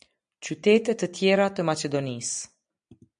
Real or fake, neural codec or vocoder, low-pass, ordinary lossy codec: real; none; 9.9 kHz; MP3, 96 kbps